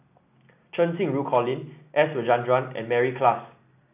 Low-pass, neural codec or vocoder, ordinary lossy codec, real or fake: 3.6 kHz; none; none; real